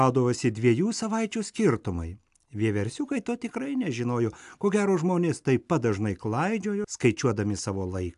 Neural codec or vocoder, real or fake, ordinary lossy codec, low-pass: none; real; AAC, 96 kbps; 10.8 kHz